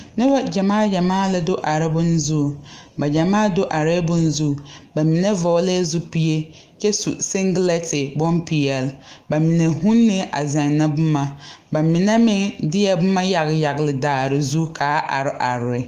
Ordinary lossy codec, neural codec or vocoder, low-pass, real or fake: Opus, 24 kbps; autoencoder, 48 kHz, 128 numbers a frame, DAC-VAE, trained on Japanese speech; 14.4 kHz; fake